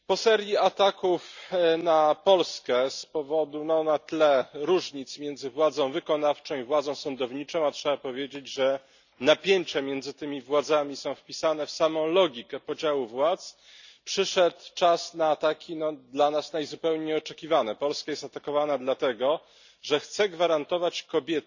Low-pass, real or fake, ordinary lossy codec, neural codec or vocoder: 7.2 kHz; real; none; none